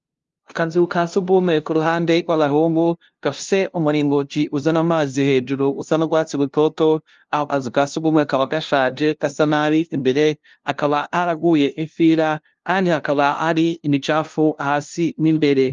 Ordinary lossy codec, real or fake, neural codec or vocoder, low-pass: Opus, 32 kbps; fake; codec, 16 kHz, 0.5 kbps, FunCodec, trained on LibriTTS, 25 frames a second; 7.2 kHz